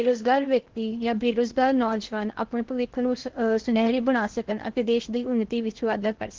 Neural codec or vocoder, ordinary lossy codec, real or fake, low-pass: codec, 16 kHz in and 24 kHz out, 0.8 kbps, FocalCodec, streaming, 65536 codes; Opus, 16 kbps; fake; 7.2 kHz